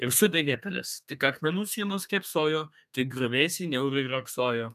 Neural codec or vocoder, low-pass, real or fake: codec, 32 kHz, 1.9 kbps, SNAC; 14.4 kHz; fake